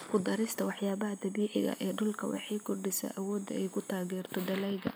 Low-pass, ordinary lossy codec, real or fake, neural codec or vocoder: none; none; real; none